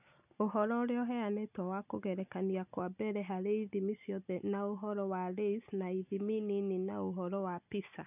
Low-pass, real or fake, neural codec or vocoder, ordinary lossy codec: 3.6 kHz; real; none; none